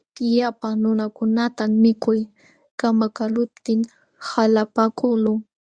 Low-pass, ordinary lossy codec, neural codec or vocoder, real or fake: 9.9 kHz; Opus, 64 kbps; codec, 24 kHz, 0.9 kbps, WavTokenizer, medium speech release version 1; fake